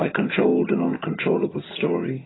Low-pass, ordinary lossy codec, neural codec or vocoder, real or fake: 7.2 kHz; AAC, 16 kbps; vocoder, 22.05 kHz, 80 mel bands, HiFi-GAN; fake